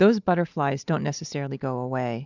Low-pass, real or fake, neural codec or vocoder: 7.2 kHz; real; none